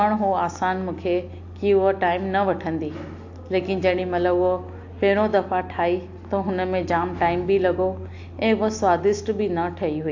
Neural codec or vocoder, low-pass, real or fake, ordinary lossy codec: none; 7.2 kHz; real; AAC, 48 kbps